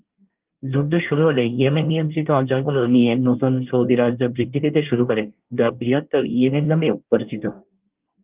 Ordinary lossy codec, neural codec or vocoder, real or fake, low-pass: Opus, 32 kbps; codec, 24 kHz, 1 kbps, SNAC; fake; 3.6 kHz